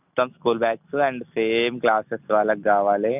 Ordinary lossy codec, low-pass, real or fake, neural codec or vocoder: none; 3.6 kHz; real; none